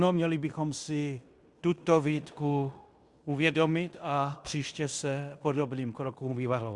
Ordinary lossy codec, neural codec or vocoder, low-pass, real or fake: Opus, 64 kbps; codec, 16 kHz in and 24 kHz out, 0.9 kbps, LongCat-Audio-Codec, fine tuned four codebook decoder; 10.8 kHz; fake